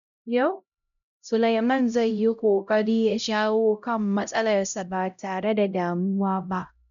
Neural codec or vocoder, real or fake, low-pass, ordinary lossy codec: codec, 16 kHz, 0.5 kbps, X-Codec, HuBERT features, trained on LibriSpeech; fake; 7.2 kHz; none